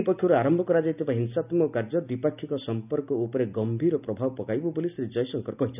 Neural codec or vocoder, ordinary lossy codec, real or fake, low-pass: none; none; real; 3.6 kHz